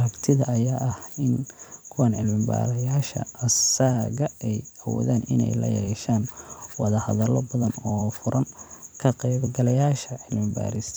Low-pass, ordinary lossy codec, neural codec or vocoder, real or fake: none; none; vocoder, 44.1 kHz, 128 mel bands every 256 samples, BigVGAN v2; fake